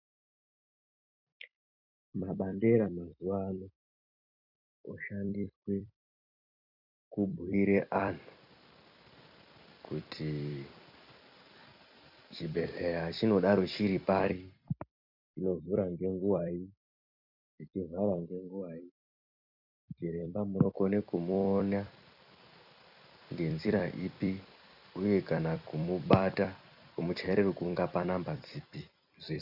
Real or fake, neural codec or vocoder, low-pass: fake; vocoder, 44.1 kHz, 128 mel bands every 256 samples, BigVGAN v2; 5.4 kHz